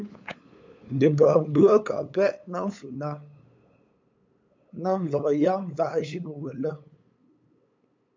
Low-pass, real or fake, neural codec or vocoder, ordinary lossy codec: 7.2 kHz; fake; codec, 16 kHz, 8 kbps, FunCodec, trained on LibriTTS, 25 frames a second; MP3, 64 kbps